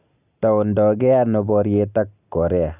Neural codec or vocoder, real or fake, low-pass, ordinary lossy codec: none; real; 3.6 kHz; none